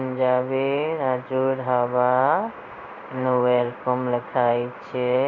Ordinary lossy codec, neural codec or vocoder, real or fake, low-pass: none; codec, 16 kHz in and 24 kHz out, 1 kbps, XY-Tokenizer; fake; 7.2 kHz